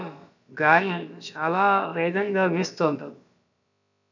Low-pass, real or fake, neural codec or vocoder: 7.2 kHz; fake; codec, 16 kHz, about 1 kbps, DyCAST, with the encoder's durations